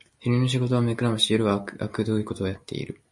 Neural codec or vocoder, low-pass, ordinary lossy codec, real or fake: none; 10.8 kHz; MP3, 48 kbps; real